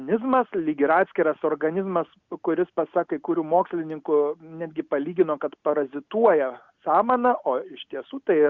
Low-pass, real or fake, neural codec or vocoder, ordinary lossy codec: 7.2 kHz; real; none; Opus, 64 kbps